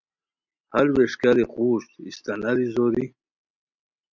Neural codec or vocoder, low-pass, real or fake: none; 7.2 kHz; real